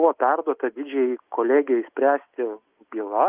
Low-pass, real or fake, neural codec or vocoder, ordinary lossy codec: 3.6 kHz; real; none; Opus, 24 kbps